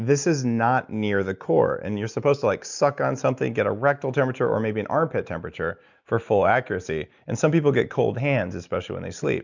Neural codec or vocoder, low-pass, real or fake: none; 7.2 kHz; real